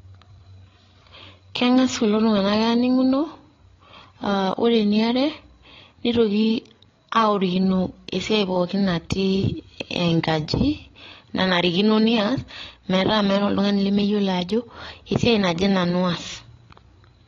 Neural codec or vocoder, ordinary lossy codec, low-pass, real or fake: codec, 16 kHz, 8 kbps, FreqCodec, larger model; AAC, 32 kbps; 7.2 kHz; fake